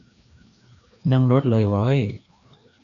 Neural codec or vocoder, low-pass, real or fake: codec, 16 kHz, 2 kbps, FreqCodec, larger model; 7.2 kHz; fake